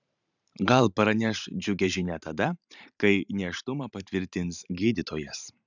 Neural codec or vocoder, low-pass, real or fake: vocoder, 44.1 kHz, 128 mel bands every 512 samples, BigVGAN v2; 7.2 kHz; fake